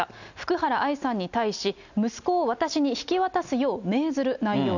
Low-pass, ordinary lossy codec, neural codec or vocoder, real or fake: 7.2 kHz; none; none; real